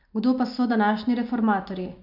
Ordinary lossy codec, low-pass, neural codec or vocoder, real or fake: none; 5.4 kHz; none; real